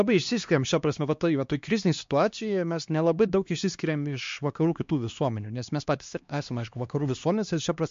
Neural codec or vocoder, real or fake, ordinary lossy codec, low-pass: codec, 16 kHz, 2 kbps, X-Codec, HuBERT features, trained on LibriSpeech; fake; MP3, 48 kbps; 7.2 kHz